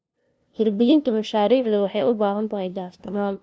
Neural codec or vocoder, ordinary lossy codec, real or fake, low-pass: codec, 16 kHz, 0.5 kbps, FunCodec, trained on LibriTTS, 25 frames a second; none; fake; none